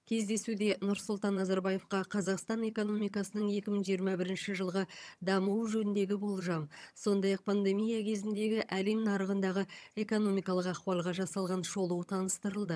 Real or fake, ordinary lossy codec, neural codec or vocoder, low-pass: fake; none; vocoder, 22.05 kHz, 80 mel bands, HiFi-GAN; none